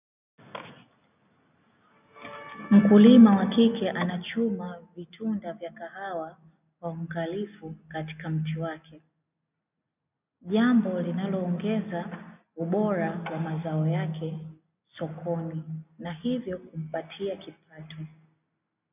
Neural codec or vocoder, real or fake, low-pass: none; real; 3.6 kHz